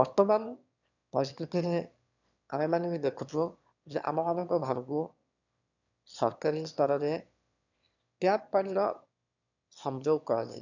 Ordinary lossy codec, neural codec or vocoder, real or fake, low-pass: none; autoencoder, 22.05 kHz, a latent of 192 numbers a frame, VITS, trained on one speaker; fake; 7.2 kHz